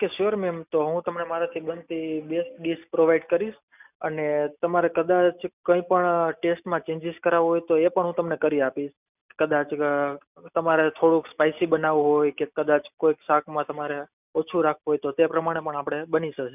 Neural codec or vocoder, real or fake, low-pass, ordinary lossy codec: none; real; 3.6 kHz; none